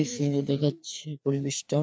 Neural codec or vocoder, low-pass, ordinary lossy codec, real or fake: codec, 16 kHz, 2 kbps, FreqCodec, larger model; none; none; fake